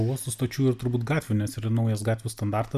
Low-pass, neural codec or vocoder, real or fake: 14.4 kHz; none; real